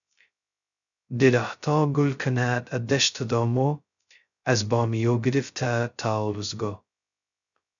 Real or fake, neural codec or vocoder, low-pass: fake; codec, 16 kHz, 0.2 kbps, FocalCodec; 7.2 kHz